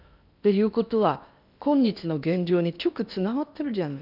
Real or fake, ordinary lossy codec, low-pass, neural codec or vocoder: fake; none; 5.4 kHz; codec, 16 kHz in and 24 kHz out, 0.8 kbps, FocalCodec, streaming, 65536 codes